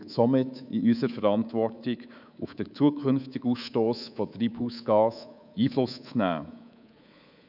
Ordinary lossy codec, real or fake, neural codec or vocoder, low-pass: none; fake; codec, 24 kHz, 3.1 kbps, DualCodec; 5.4 kHz